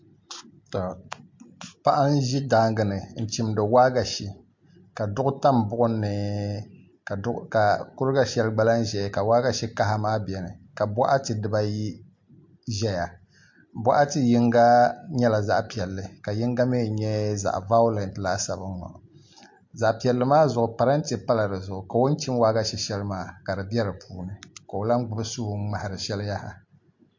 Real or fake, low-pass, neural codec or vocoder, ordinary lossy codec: real; 7.2 kHz; none; MP3, 48 kbps